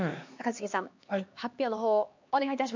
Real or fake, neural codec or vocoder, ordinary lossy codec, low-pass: fake; codec, 16 kHz, 2 kbps, X-Codec, HuBERT features, trained on LibriSpeech; MP3, 48 kbps; 7.2 kHz